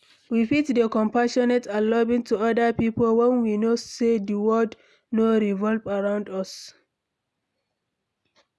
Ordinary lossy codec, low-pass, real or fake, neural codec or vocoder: none; none; real; none